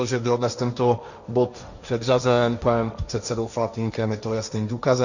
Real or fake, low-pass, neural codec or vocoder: fake; 7.2 kHz; codec, 16 kHz, 1.1 kbps, Voila-Tokenizer